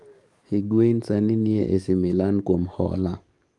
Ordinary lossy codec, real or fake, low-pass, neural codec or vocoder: Opus, 32 kbps; fake; 10.8 kHz; codec, 44.1 kHz, 7.8 kbps, DAC